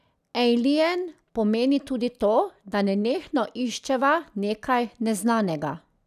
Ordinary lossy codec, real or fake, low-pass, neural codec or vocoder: none; real; 14.4 kHz; none